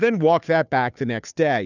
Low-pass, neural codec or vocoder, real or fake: 7.2 kHz; codec, 16 kHz, 2 kbps, FunCodec, trained on Chinese and English, 25 frames a second; fake